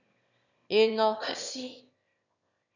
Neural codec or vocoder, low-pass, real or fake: autoencoder, 22.05 kHz, a latent of 192 numbers a frame, VITS, trained on one speaker; 7.2 kHz; fake